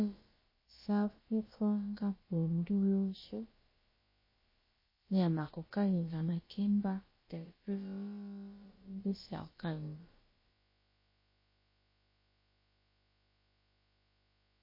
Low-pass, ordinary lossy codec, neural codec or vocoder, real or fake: 5.4 kHz; MP3, 24 kbps; codec, 16 kHz, about 1 kbps, DyCAST, with the encoder's durations; fake